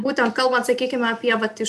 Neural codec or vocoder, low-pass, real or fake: none; 14.4 kHz; real